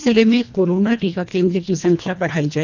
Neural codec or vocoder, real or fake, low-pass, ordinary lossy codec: codec, 24 kHz, 1.5 kbps, HILCodec; fake; 7.2 kHz; none